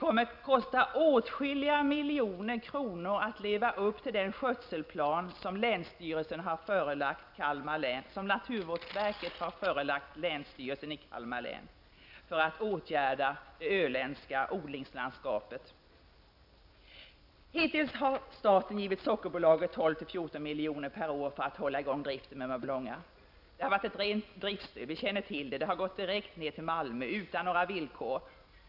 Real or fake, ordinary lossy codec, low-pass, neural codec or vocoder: real; none; 5.4 kHz; none